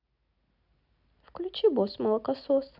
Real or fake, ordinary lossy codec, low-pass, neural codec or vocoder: real; none; 5.4 kHz; none